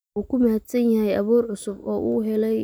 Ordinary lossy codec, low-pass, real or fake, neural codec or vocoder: none; none; real; none